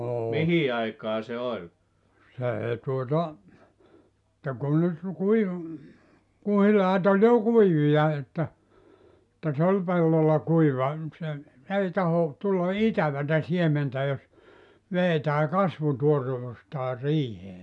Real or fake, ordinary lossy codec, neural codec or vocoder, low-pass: real; none; none; 10.8 kHz